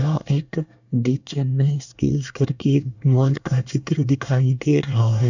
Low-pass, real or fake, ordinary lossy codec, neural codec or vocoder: 7.2 kHz; fake; none; codec, 24 kHz, 1 kbps, SNAC